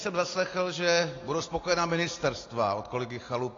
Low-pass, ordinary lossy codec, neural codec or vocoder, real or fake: 7.2 kHz; AAC, 32 kbps; none; real